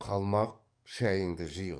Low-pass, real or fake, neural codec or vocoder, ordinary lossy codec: 9.9 kHz; fake; codec, 44.1 kHz, 7.8 kbps, DAC; none